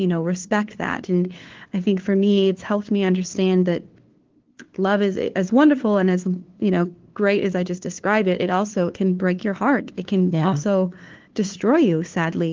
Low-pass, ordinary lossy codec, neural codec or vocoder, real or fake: 7.2 kHz; Opus, 16 kbps; codec, 16 kHz, 2 kbps, FunCodec, trained on LibriTTS, 25 frames a second; fake